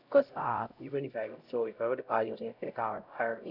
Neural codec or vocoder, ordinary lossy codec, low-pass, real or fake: codec, 16 kHz, 0.5 kbps, X-Codec, HuBERT features, trained on LibriSpeech; none; 5.4 kHz; fake